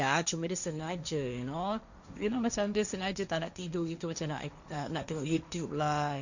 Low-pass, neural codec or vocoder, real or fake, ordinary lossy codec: 7.2 kHz; codec, 16 kHz, 1.1 kbps, Voila-Tokenizer; fake; none